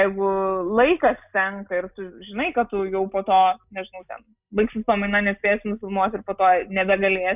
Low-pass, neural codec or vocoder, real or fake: 3.6 kHz; none; real